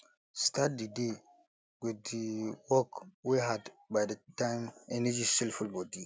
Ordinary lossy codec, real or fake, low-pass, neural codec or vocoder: none; real; none; none